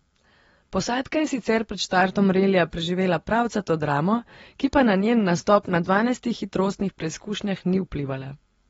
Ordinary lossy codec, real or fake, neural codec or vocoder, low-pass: AAC, 24 kbps; real; none; 19.8 kHz